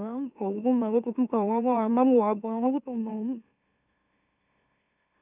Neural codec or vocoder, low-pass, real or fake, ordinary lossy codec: autoencoder, 44.1 kHz, a latent of 192 numbers a frame, MeloTTS; 3.6 kHz; fake; none